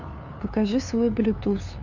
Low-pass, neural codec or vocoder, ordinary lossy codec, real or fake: 7.2 kHz; codec, 16 kHz, 2 kbps, FunCodec, trained on LibriTTS, 25 frames a second; none; fake